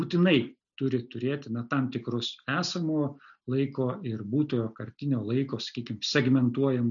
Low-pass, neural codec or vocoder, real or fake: 7.2 kHz; none; real